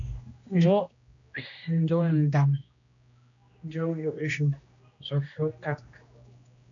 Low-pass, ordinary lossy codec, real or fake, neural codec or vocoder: 7.2 kHz; AAC, 64 kbps; fake; codec, 16 kHz, 1 kbps, X-Codec, HuBERT features, trained on general audio